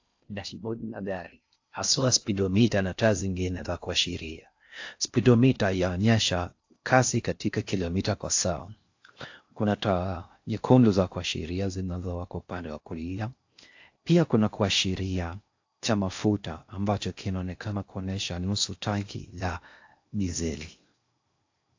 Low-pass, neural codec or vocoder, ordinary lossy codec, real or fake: 7.2 kHz; codec, 16 kHz in and 24 kHz out, 0.6 kbps, FocalCodec, streaming, 4096 codes; AAC, 48 kbps; fake